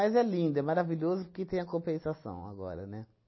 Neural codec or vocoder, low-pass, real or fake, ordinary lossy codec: none; 7.2 kHz; real; MP3, 24 kbps